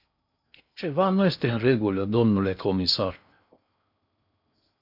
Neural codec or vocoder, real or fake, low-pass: codec, 16 kHz in and 24 kHz out, 0.6 kbps, FocalCodec, streaming, 2048 codes; fake; 5.4 kHz